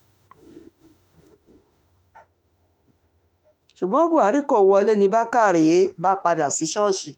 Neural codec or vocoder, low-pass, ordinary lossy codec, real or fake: autoencoder, 48 kHz, 32 numbers a frame, DAC-VAE, trained on Japanese speech; 19.8 kHz; none; fake